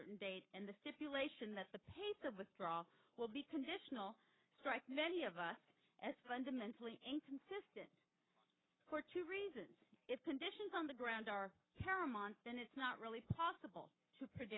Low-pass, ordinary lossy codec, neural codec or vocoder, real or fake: 7.2 kHz; AAC, 16 kbps; codec, 44.1 kHz, 7.8 kbps, Pupu-Codec; fake